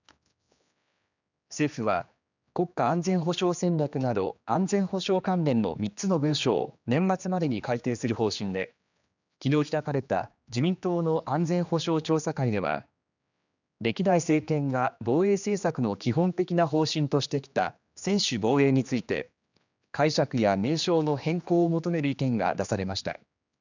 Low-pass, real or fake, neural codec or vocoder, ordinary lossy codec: 7.2 kHz; fake; codec, 16 kHz, 2 kbps, X-Codec, HuBERT features, trained on general audio; none